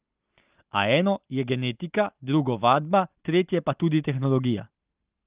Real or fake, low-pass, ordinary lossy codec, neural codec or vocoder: real; 3.6 kHz; Opus, 24 kbps; none